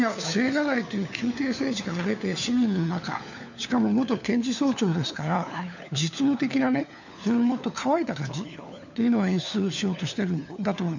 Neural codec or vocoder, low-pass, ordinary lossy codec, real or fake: codec, 16 kHz, 4 kbps, FunCodec, trained on LibriTTS, 50 frames a second; 7.2 kHz; none; fake